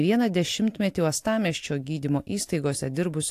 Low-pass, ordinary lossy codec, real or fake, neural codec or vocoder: 14.4 kHz; AAC, 64 kbps; real; none